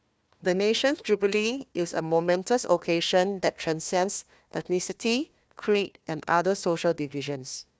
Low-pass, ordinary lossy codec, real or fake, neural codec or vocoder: none; none; fake; codec, 16 kHz, 1 kbps, FunCodec, trained on Chinese and English, 50 frames a second